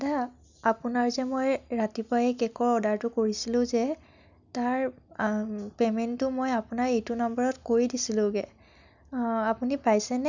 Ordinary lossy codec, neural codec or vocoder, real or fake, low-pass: none; none; real; 7.2 kHz